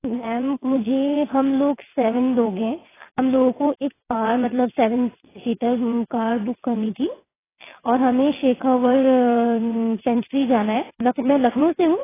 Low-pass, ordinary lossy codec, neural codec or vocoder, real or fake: 3.6 kHz; AAC, 16 kbps; vocoder, 22.05 kHz, 80 mel bands, WaveNeXt; fake